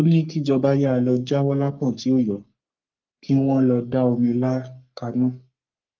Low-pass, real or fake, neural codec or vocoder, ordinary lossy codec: 7.2 kHz; fake; codec, 44.1 kHz, 3.4 kbps, Pupu-Codec; Opus, 24 kbps